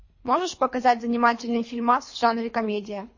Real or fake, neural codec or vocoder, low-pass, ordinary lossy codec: fake; codec, 24 kHz, 3 kbps, HILCodec; 7.2 kHz; MP3, 32 kbps